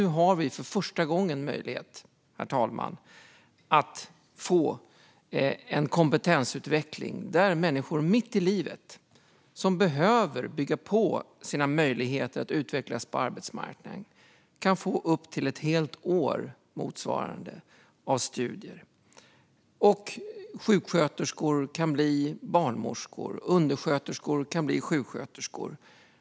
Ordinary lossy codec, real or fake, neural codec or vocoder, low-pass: none; real; none; none